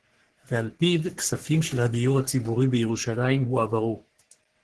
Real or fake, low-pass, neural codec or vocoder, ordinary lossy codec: fake; 10.8 kHz; codec, 44.1 kHz, 3.4 kbps, Pupu-Codec; Opus, 16 kbps